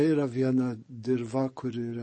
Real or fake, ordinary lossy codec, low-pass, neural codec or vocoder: fake; MP3, 32 kbps; 9.9 kHz; codec, 44.1 kHz, 7.8 kbps, DAC